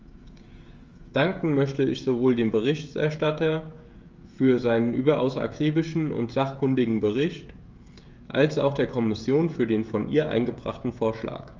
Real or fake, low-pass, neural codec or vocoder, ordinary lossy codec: fake; 7.2 kHz; codec, 16 kHz, 16 kbps, FreqCodec, smaller model; Opus, 32 kbps